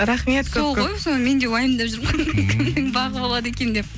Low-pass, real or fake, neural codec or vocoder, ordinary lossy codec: none; real; none; none